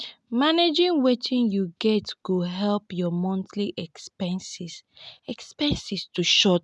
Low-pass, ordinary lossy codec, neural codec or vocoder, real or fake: none; none; none; real